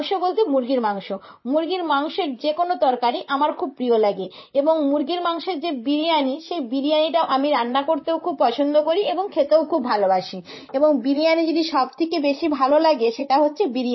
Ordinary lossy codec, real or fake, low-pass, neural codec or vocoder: MP3, 24 kbps; fake; 7.2 kHz; vocoder, 44.1 kHz, 128 mel bands, Pupu-Vocoder